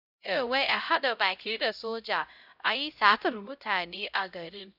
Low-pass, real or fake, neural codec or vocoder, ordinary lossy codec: 5.4 kHz; fake; codec, 16 kHz, 0.5 kbps, X-Codec, HuBERT features, trained on LibriSpeech; none